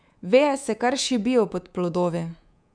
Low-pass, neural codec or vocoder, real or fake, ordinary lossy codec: 9.9 kHz; codec, 24 kHz, 3.1 kbps, DualCodec; fake; none